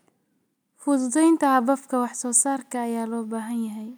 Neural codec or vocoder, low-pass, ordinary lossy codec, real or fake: none; none; none; real